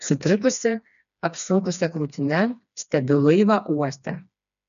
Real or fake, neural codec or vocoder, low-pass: fake; codec, 16 kHz, 2 kbps, FreqCodec, smaller model; 7.2 kHz